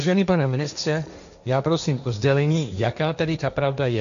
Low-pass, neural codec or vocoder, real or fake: 7.2 kHz; codec, 16 kHz, 1.1 kbps, Voila-Tokenizer; fake